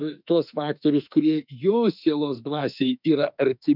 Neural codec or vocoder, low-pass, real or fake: autoencoder, 48 kHz, 32 numbers a frame, DAC-VAE, trained on Japanese speech; 5.4 kHz; fake